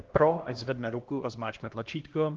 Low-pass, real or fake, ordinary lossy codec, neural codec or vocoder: 7.2 kHz; fake; Opus, 16 kbps; codec, 16 kHz, 1 kbps, X-Codec, HuBERT features, trained on LibriSpeech